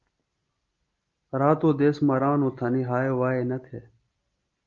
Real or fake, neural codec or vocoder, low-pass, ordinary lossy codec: real; none; 7.2 kHz; Opus, 32 kbps